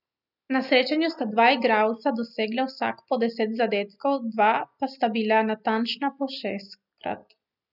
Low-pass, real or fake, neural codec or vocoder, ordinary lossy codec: 5.4 kHz; real; none; none